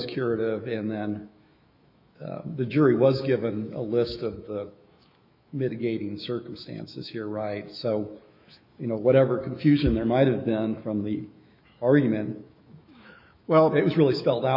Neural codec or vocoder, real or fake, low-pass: autoencoder, 48 kHz, 128 numbers a frame, DAC-VAE, trained on Japanese speech; fake; 5.4 kHz